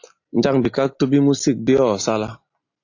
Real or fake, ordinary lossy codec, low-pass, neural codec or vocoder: real; AAC, 48 kbps; 7.2 kHz; none